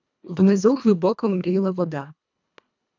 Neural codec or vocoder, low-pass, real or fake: codec, 24 kHz, 1.5 kbps, HILCodec; 7.2 kHz; fake